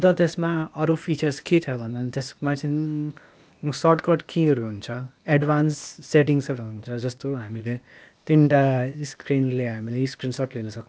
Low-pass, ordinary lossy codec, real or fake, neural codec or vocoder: none; none; fake; codec, 16 kHz, 0.8 kbps, ZipCodec